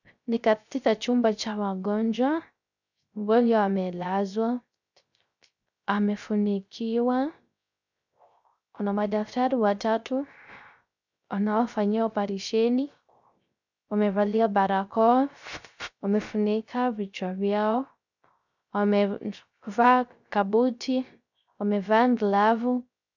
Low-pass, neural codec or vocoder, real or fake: 7.2 kHz; codec, 16 kHz, 0.3 kbps, FocalCodec; fake